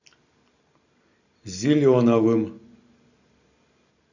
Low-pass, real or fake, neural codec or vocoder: 7.2 kHz; real; none